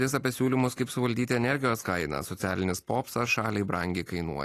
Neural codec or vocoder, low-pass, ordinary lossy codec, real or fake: none; 14.4 kHz; AAC, 48 kbps; real